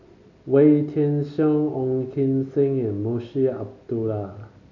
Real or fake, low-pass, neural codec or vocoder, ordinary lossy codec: real; 7.2 kHz; none; none